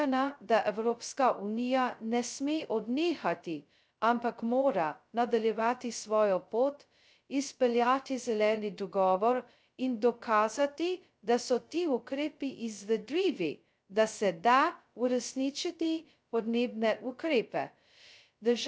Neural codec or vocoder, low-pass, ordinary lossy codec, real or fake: codec, 16 kHz, 0.2 kbps, FocalCodec; none; none; fake